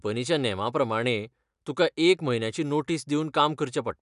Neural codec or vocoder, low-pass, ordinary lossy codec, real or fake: none; 10.8 kHz; none; real